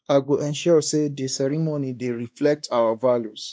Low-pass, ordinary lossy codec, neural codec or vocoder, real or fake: none; none; codec, 16 kHz, 2 kbps, X-Codec, WavLM features, trained on Multilingual LibriSpeech; fake